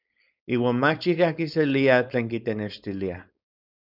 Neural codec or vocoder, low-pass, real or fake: codec, 16 kHz, 4.8 kbps, FACodec; 5.4 kHz; fake